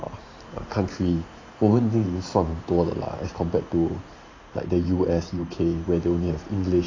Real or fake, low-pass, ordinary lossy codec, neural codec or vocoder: fake; 7.2 kHz; AAC, 32 kbps; vocoder, 44.1 kHz, 128 mel bands every 512 samples, BigVGAN v2